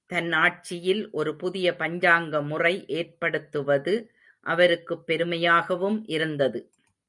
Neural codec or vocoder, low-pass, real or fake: none; 10.8 kHz; real